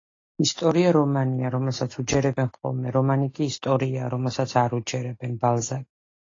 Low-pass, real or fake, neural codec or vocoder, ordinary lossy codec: 7.2 kHz; real; none; AAC, 32 kbps